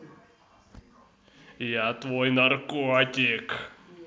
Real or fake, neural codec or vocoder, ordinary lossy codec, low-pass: real; none; none; none